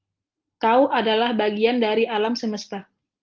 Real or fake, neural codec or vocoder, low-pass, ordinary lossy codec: real; none; 7.2 kHz; Opus, 32 kbps